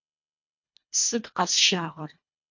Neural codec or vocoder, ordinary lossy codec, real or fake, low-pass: codec, 24 kHz, 1.5 kbps, HILCodec; MP3, 48 kbps; fake; 7.2 kHz